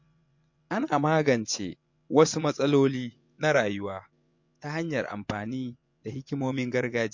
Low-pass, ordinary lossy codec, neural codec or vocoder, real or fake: 7.2 kHz; MP3, 48 kbps; vocoder, 24 kHz, 100 mel bands, Vocos; fake